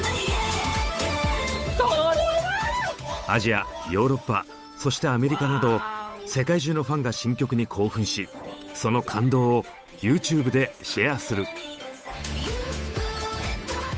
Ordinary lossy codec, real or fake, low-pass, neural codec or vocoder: none; fake; none; codec, 16 kHz, 8 kbps, FunCodec, trained on Chinese and English, 25 frames a second